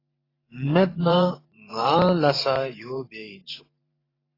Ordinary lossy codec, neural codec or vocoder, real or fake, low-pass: AAC, 24 kbps; vocoder, 44.1 kHz, 128 mel bands every 512 samples, BigVGAN v2; fake; 5.4 kHz